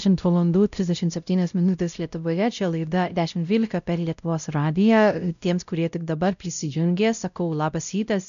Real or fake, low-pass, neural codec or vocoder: fake; 7.2 kHz; codec, 16 kHz, 0.5 kbps, X-Codec, WavLM features, trained on Multilingual LibriSpeech